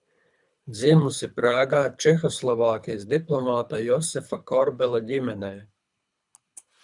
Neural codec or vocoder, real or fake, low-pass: codec, 24 kHz, 3 kbps, HILCodec; fake; 10.8 kHz